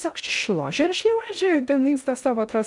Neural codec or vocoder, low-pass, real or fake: codec, 16 kHz in and 24 kHz out, 0.6 kbps, FocalCodec, streaming, 2048 codes; 10.8 kHz; fake